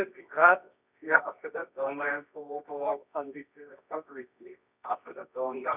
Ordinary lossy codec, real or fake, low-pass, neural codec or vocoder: none; fake; 3.6 kHz; codec, 24 kHz, 0.9 kbps, WavTokenizer, medium music audio release